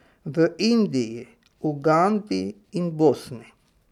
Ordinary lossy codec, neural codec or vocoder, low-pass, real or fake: none; none; 19.8 kHz; real